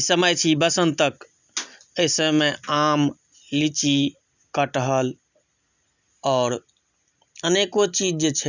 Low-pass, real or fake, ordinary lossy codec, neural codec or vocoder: 7.2 kHz; real; none; none